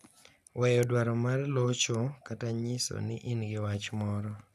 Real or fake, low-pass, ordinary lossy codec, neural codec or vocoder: real; 14.4 kHz; none; none